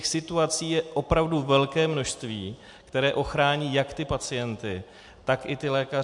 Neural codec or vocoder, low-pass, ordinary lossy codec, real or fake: none; 10.8 kHz; MP3, 64 kbps; real